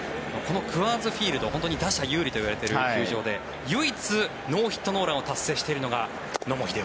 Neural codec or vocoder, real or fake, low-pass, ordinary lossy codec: none; real; none; none